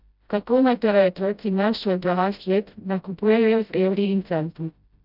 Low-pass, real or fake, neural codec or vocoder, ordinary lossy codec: 5.4 kHz; fake; codec, 16 kHz, 0.5 kbps, FreqCodec, smaller model; none